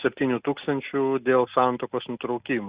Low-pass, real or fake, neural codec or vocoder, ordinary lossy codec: 3.6 kHz; real; none; Opus, 64 kbps